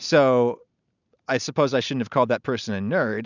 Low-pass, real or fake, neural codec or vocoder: 7.2 kHz; real; none